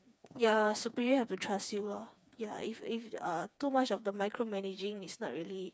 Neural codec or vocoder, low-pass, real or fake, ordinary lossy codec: codec, 16 kHz, 4 kbps, FreqCodec, smaller model; none; fake; none